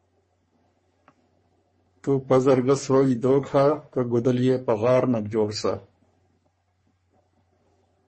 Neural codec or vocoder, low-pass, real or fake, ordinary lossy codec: codec, 44.1 kHz, 3.4 kbps, Pupu-Codec; 10.8 kHz; fake; MP3, 32 kbps